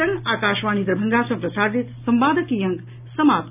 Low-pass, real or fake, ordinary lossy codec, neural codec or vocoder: 3.6 kHz; real; none; none